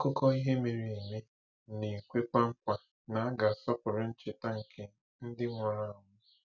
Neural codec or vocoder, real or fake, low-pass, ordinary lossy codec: none; real; 7.2 kHz; none